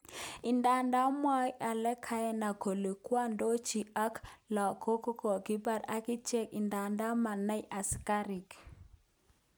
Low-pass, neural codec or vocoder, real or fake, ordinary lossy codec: none; none; real; none